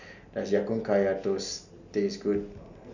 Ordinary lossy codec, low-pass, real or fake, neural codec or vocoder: none; 7.2 kHz; real; none